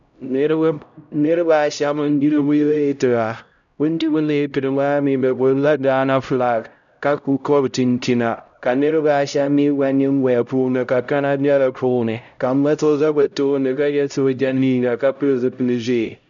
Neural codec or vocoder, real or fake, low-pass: codec, 16 kHz, 0.5 kbps, X-Codec, HuBERT features, trained on LibriSpeech; fake; 7.2 kHz